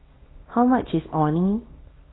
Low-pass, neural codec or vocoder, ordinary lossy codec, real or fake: 7.2 kHz; codec, 24 kHz, 0.9 kbps, WavTokenizer, small release; AAC, 16 kbps; fake